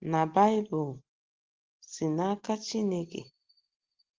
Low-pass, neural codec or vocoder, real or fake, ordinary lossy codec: 7.2 kHz; none; real; Opus, 16 kbps